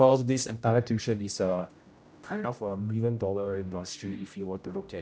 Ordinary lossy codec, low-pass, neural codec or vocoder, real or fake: none; none; codec, 16 kHz, 0.5 kbps, X-Codec, HuBERT features, trained on general audio; fake